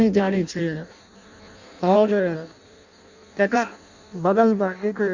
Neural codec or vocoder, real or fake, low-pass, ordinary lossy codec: codec, 16 kHz in and 24 kHz out, 0.6 kbps, FireRedTTS-2 codec; fake; 7.2 kHz; Opus, 64 kbps